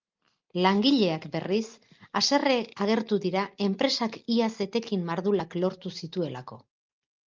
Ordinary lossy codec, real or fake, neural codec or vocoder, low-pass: Opus, 32 kbps; real; none; 7.2 kHz